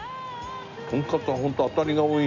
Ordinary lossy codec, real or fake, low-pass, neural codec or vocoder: none; real; 7.2 kHz; none